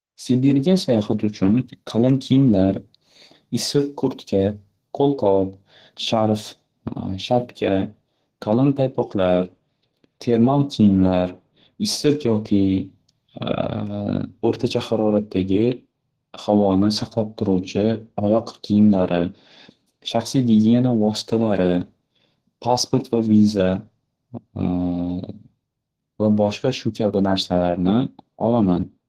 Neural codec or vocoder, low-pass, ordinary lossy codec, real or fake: codec, 32 kHz, 1.9 kbps, SNAC; 14.4 kHz; Opus, 16 kbps; fake